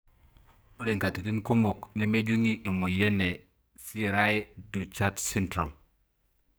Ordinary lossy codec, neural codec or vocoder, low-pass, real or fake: none; codec, 44.1 kHz, 2.6 kbps, SNAC; none; fake